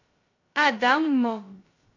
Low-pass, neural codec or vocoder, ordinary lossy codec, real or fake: 7.2 kHz; codec, 16 kHz, 0.2 kbps, FocalCodec; AAC, 32 kbps; fake